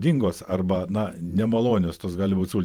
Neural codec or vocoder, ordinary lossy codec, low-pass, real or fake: vocoder, 44.1 kHz, 128 mel bands every 512 samples, BigVGAN v2; Opus, 32 kbps; 19.8 kHz; fake